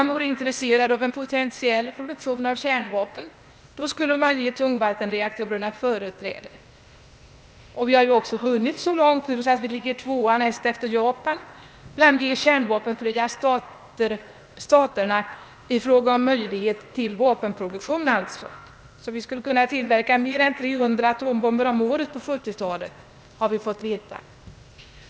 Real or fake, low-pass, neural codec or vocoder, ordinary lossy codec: fake; none; codec, 16 kHz, 0.8 kbps, ZipCodec; none